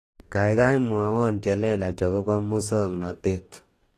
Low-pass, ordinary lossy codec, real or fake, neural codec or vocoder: 14.4 kHz; AAC, 48 kbps; fake; codec, 44.1 kHz, 2.6 kbps, DAC